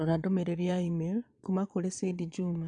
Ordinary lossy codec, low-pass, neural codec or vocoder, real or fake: none; 9.9 kHz; vocoder, 22.05 kHz, 80 mel bands, Vocos; fake